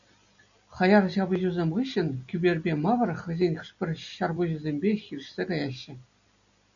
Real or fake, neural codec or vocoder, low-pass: real; none; 7.2 kHz